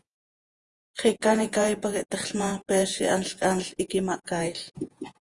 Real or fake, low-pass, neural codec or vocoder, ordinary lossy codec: fake; 10.8 kHz; vocoder, 48 kHz, 128 mel bands, Vocos; Opus, 32 kbps